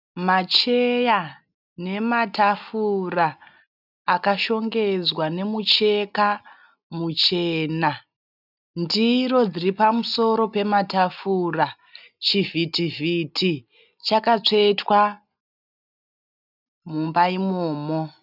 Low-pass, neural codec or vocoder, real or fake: 5.4 kHz; none; real